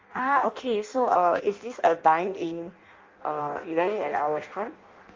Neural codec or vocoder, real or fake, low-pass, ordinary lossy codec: codec, 16 kHz in and 24 kHz out, 0.6 kbps, FireRedTTS-2 codec; fake; 7.2 kHz; Opus, 32 kbps